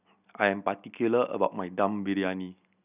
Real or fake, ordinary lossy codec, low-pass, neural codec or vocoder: real; none; 3.6 kHz; none